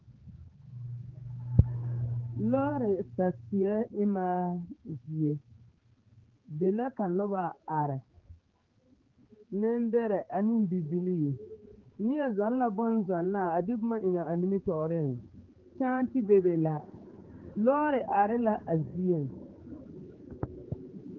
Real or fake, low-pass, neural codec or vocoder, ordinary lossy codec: fake; 7.2 kHz; codec, 16 kHz, 4 kbps, X-Codec, HuBERT features, trained on general audio; Opus, 16 kbps